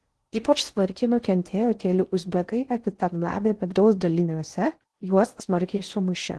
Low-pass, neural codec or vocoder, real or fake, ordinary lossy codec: 10.8 kHz; codec, 16 kHz in and 24 kHz out, 0.6 kbps, FocalCodec, streaming, 2048 codes; fake; Opus, 16 kbps